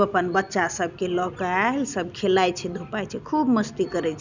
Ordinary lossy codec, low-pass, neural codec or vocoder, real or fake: none; 7.2 kHz; none; real